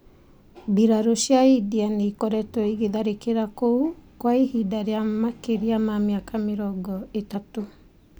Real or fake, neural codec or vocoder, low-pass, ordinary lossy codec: real; none; none; none